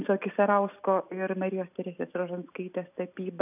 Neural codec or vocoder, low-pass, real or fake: none; 3.6 kHz; real